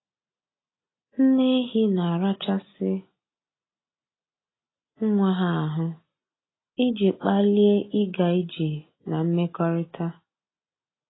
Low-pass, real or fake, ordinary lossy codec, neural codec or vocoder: 7.2 kHz; real; AAC, 16 kbps; none